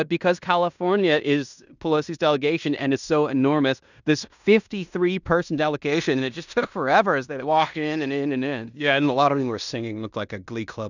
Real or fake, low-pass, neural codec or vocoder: fake; 7.2 kHz; codec, 16 kHz in and 24 kHz out, 0.9 kbps, LongCat-Audio-Codec, fine tuned four codebook decoder